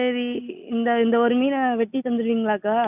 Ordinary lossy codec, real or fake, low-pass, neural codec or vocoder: none; real; 3.6 kHz; none